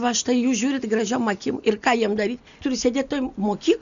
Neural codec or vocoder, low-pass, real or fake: none; 7.2 kHz; real